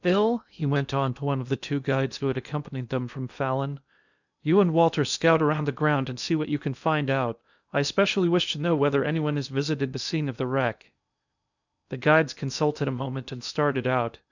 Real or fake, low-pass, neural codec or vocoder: fake; 7.2 kHz; codec, 16 kHz in and 24 kHz out, 0.8 kbps, FocalCodec, streaming, 65536 codes